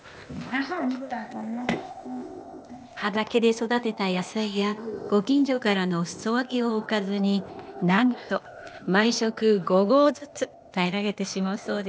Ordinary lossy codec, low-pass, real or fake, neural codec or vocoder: none; none; fake; codec, 16 kHz, 0.8 kbps, ZipCodec